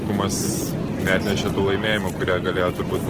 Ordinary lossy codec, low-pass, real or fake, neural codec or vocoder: Opus, 16 kbps; 14.4 kHz; real; none